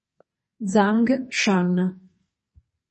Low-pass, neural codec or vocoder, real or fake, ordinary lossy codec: 10.8 kHz; codec, 44.1 kHz, 2.6 kbps, SNAC; fake; MP3, 32 kbps